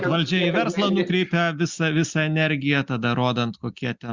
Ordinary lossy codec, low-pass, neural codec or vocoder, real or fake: Opus, 64 kbps; 7.2 kHz; none; real